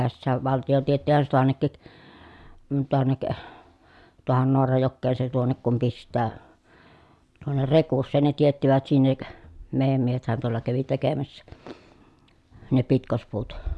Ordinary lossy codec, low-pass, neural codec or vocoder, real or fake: none; none; none; real